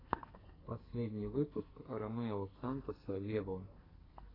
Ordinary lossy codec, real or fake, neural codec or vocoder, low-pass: AAC, 24 kbps; fake; codec, 44.1 kHz, 2.6 kbps, SNAC; 5.4 kHz